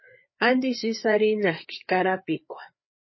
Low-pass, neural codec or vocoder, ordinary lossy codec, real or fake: 7.2 kHz; codec, 16 kHz, 4 kbps, FreqCodec, larger model; MP3, 24 kbps; fake